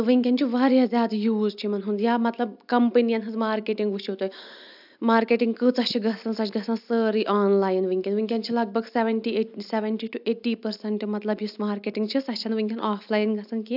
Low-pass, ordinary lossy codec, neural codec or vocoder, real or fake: 5.4 kHz; AAC, 48 kbps; none; real